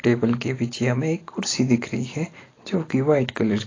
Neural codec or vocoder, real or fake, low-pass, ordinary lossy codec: none; real; 7.2 kHz; AAC, 32 kbps